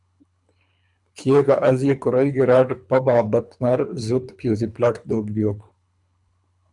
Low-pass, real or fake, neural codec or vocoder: 10.8 kHz; fake; codec, 24 kHz, 3 kbps, HILCodec